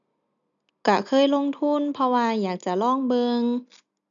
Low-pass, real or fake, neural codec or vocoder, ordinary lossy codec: 7.2 kHz; real; none; none